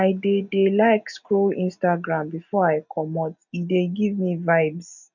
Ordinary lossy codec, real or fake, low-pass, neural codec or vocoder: none; real; 7.2 kHz; none